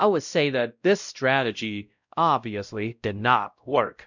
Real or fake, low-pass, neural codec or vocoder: fake; 7.2 kHz; codec, 16 kHz, 0.5 kbps, X-Codec, WavLM features, trained on Multilingual LibriSpeech